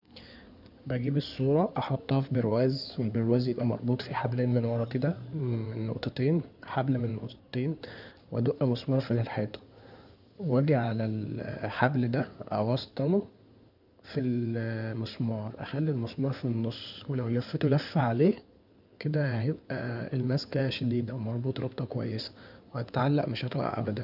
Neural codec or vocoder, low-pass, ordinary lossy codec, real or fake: codec, 16 kHz in and 24 kHz out, 2.2 kbps, FireRedTTS-2 codec; 5.4 kHz; none; fake